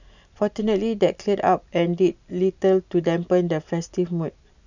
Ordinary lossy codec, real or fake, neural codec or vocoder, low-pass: none; real; none; 7.2 kHz